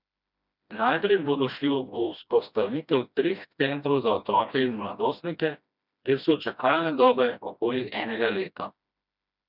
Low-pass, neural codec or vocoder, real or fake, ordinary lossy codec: 5.4 kHz; codec, 16 kHz, 1 kbps, FreqCodec, smaller model; fake; none